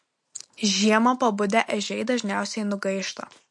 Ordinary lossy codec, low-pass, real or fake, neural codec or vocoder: MP3, 48 kbps; 10.8 kHz; real; none